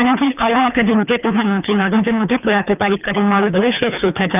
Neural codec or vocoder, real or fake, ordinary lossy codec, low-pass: codec, 24 kHz, 3 kbps, HILCodec; fake; none; 3.6 kHz